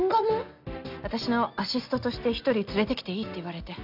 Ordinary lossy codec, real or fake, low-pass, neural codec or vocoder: none; real; 5.4 kHz; none